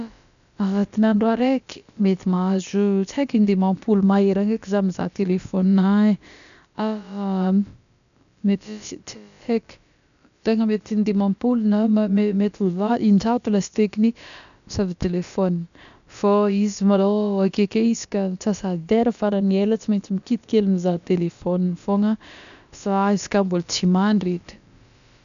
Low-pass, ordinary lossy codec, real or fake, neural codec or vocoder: 7.2 kHz; none; fake; codec, 16 kHz, about 1 kbps, DyCAST, with the encoder's durations